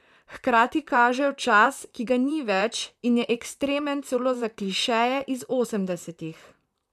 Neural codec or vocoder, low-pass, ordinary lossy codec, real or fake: vocoder, 44.1 kHz, 128 mel bands, Pupu-Vocoder; 14.4 kHz; none; fake